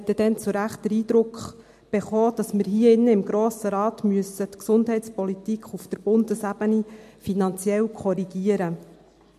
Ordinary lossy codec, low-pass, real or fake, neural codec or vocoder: MP3, 64 kbps; 14.4 kHz; real; none